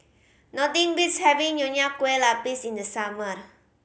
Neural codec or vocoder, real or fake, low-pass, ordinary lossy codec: none; real; none; none